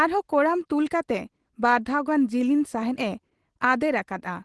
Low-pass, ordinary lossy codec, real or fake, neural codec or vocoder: 10.8 kHz; Opus, 16 kbps; real; none